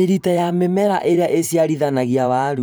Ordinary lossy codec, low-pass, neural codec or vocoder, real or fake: none; none; vocoder, 44.1 kHz, 128 mel bands every 512 samples, BigVGAN v2; fake